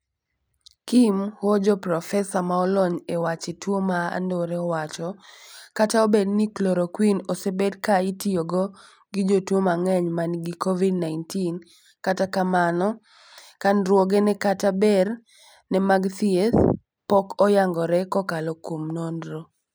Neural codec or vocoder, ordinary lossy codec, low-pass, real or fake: none; none; none; real